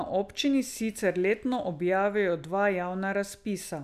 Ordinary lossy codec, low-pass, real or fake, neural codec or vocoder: none; 14.4 kHz; real; none